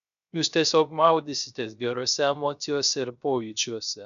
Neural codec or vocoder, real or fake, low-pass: codec, 16 kHz, 0.3 kbps, FocalCodec; fake; 7.2 kHz